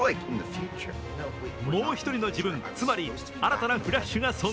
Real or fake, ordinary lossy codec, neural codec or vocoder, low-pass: real; none; none; none